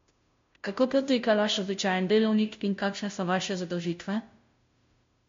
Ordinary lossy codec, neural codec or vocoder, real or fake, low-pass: MP3, 48 kbps; codec, 16 kHz, 0.5 kbps, FunCodec, trained on Chinese and English, 25 frames a second; fake; 7.2 kHz